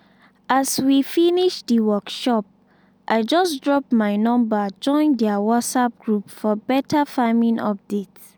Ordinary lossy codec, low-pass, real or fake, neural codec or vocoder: none; none; real; none